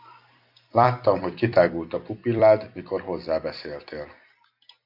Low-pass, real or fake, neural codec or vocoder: 5.4 kHz; fake; vocoder, 44.1 kHz, 128 mel bands every 256 samples, BigVGAN v2